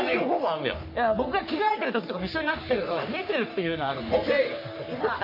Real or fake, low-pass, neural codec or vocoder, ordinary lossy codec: fake; 5.4 kHz; codec, 44.1 kHz, 3.4 kbps, Pupu-Codec; MP3, 32 kbps